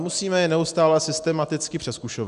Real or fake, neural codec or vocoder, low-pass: real; none; 10.8 kHz